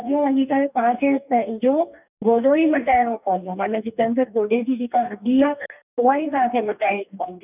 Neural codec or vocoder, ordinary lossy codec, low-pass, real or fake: codec, 44.1 kHz, 2.6 kbps, DAC; none; 3.6 kHz; fake